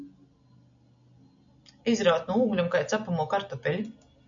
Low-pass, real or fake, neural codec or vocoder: 7.2 kHz; real; none